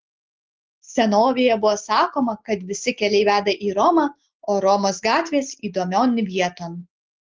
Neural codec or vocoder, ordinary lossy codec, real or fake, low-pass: none; Opus, 16 kbps; real; 7.2 kHz